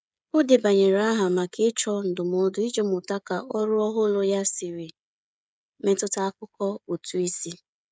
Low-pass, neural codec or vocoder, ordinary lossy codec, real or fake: none; codec, 16 kHz, 16 kbps, FreqCodec, smaller model; none; fake